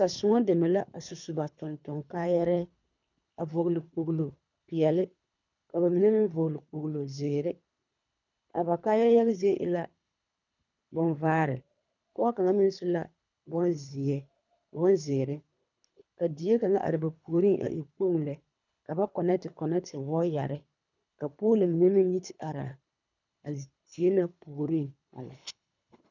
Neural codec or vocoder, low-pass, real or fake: codec, 24 kHz, 3 kbps, HILCodec; 7.2 kHz; fake